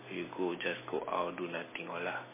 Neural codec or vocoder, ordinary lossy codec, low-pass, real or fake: none; MP3, 16 kbps; 3.6 kHz; real